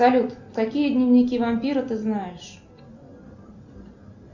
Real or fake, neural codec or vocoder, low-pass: real; none; 7.2 kHz